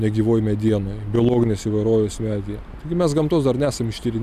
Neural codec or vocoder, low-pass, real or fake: none; 14.4 kHz; real